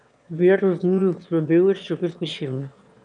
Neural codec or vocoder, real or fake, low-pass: autoencoder, 22.05 kHz, a latent of 192 numbers a frame, VITS, trained on one speaker; fake; 9.9 kHz